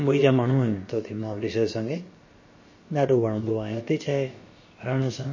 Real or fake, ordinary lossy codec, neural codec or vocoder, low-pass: fake; MP3, 32 kbps; codec, 16 kHz, about 1 kbps, DyCAST, with the encoder's durations; 7.2 kHz